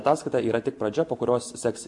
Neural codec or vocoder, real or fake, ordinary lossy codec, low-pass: none; real; MP3, 64 kbps; 10.8 kHz